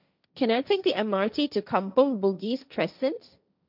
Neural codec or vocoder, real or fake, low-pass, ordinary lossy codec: codec, 16 kHz, 1.1 kbps, Voila-Tokenizer; fake; 5.4 kHz; none